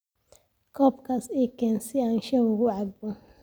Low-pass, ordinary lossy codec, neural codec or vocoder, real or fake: none; none; none; real